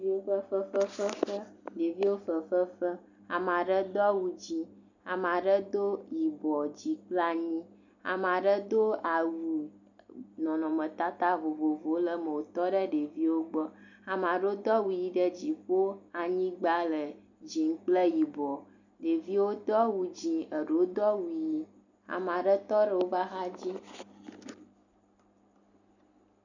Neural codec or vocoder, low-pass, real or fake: none; 7.2 kHz; real